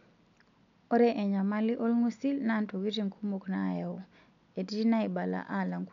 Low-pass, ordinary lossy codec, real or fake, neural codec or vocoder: 7.2 kHz; none; real; none